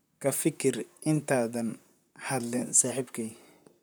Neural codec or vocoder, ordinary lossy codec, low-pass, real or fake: vocoder, 44.1 kHz, 128 mel bands, Pupu-Vocoder; none; none; fake